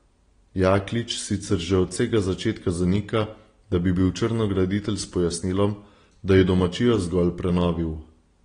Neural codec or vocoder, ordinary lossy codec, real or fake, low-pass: none; AAC, 32 kbps; real; 9.9 kHz